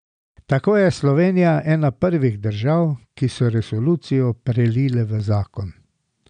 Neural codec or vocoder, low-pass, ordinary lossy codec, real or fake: none; 9.9 kHz; none; real